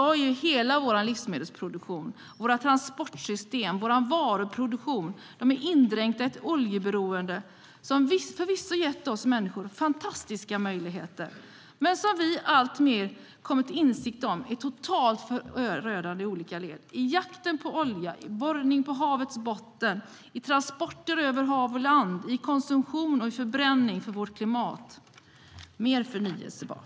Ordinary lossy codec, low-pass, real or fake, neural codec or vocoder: none; none; real; none